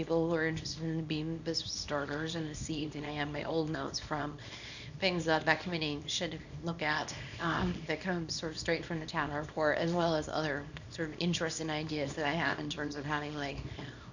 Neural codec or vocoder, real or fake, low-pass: codec, 24 kHz, 0.9 kbps, WavTokenizer, small release; fake; 7.2 kHz